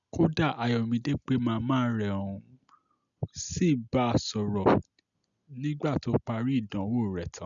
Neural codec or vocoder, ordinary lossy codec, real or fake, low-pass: none; none; real; 7.2 kHz